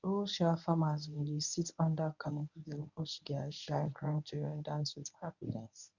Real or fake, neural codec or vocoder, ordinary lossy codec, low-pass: fake; codec, 24 kHz, 0.9 kbps, WavTokenizer, medium speech release version 1; none; 7.2 kHz